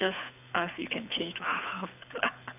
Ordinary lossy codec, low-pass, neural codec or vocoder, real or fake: none; 3.6 kHz; codec, 24 kHz, 6 kbps, HILCodec; fake